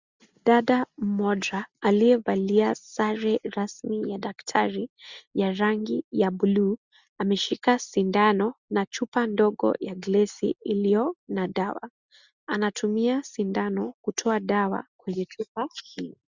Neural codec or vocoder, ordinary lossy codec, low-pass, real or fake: none; Opus, 64 kbps; 7.2 kHz; real